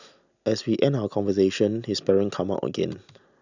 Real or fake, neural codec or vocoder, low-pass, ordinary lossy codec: real; none; 7.2 kHz; none